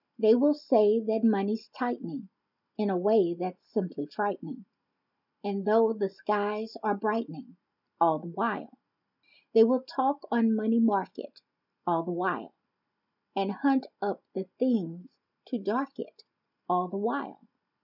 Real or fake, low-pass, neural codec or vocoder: real; 5.4 kHz; none